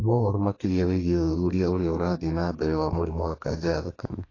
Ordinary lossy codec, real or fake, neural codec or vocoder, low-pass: AAC, 32 kbps; fake; codec, 32 kHz, 1.9 kbps, SNAC; 7.2 kHz